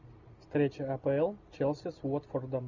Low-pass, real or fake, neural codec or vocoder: 7.2 kHz; real; none